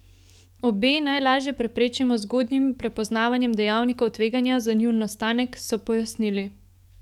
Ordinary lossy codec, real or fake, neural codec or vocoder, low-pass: none; fake; codec, 44.1 kHz, 7.8 kbps, DAC; 19.8 kHz